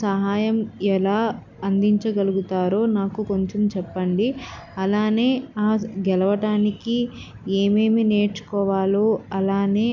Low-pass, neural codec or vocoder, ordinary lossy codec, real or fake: 7.2 kHz; none; none; real